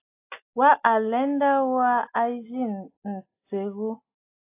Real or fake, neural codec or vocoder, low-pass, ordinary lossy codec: real; none; 3.6 kHz; AAC, 24 kbps